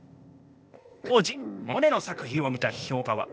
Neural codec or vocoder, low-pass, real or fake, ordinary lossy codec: codec, 16 kHz, 0.8 kbps, ZipCodec; none; fake; none